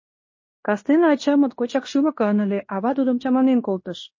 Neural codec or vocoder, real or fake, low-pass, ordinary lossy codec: codec, 16 kHz in and 24 kHz out, 0.9 kbps, LongCat-Audio-Codec, fine tuned four codebook decoder; fake; 7.2 kHz; MP3, 32 kbps